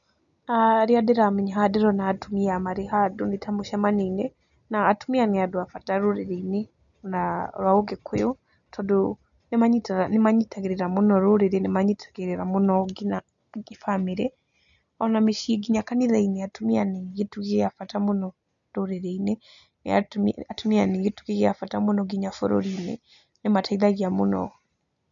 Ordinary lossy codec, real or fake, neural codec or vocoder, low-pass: none; real; none; 7.2 kHz